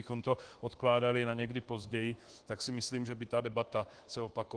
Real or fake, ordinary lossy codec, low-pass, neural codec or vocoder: fake; Opus, 16 kbps; 9.9 kHz; codec, 24 kHz, 1.2 kbps, DualCodec